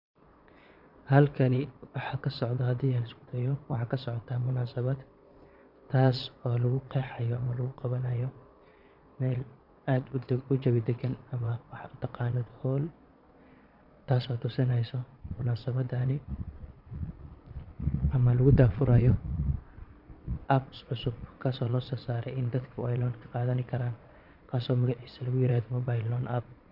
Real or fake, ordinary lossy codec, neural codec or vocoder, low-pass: fake; none; vocoder, 22.05 kHz, 80 mel bands, Vocos; 5.4 kHz